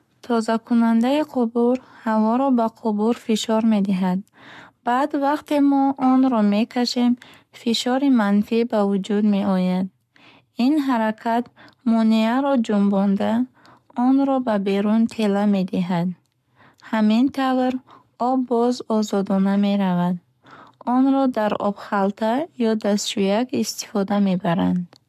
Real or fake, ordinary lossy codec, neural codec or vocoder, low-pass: fake; MP3, 96 kbps; codec, 44.1 kHz, 7.8 kbps, Pupu-Codec; 14.4 kHz